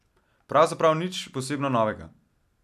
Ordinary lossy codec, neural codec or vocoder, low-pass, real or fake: none; none; 14.4 kHz; real